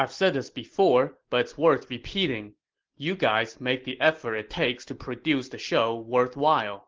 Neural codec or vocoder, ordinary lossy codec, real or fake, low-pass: none; Opus, 16 kbps; real; 7.2 kHz